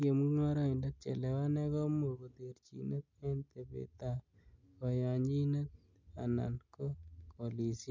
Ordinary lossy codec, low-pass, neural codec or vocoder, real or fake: MP3, 64 kbps; 7.2 kHz; none; real